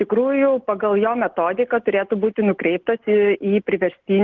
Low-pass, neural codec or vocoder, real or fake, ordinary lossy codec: 7.2 kHz; none; real; Opus, 16 kbps